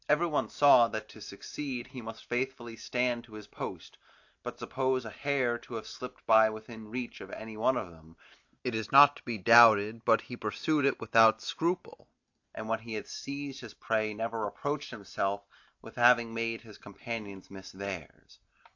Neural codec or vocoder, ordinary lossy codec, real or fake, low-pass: none; AAC, 48 kbps; real; 7.2 kHz